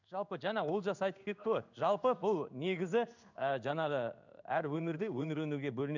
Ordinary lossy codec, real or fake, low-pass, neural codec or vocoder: none; fake; 7.2 kHz; codec, 16 kHz in and 24 kHz out, 1 kbps, XY-Tokenizer